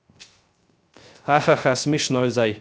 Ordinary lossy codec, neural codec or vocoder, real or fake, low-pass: none; codec, 16 kHz, 0.3 kbps, FocalCodec; fake; none